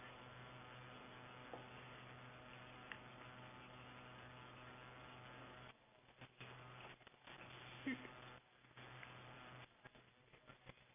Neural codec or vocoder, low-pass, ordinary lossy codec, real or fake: none; 3.6 kHz; none; real